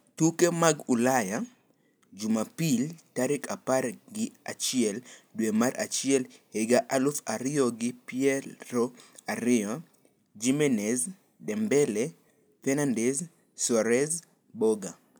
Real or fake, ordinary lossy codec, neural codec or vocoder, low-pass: real; none; none; none